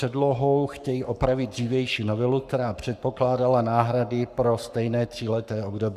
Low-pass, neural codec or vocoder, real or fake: 14.4 kHz; codec, 44.1 kHz, 7.8 kbps, Pupu-Codec; fake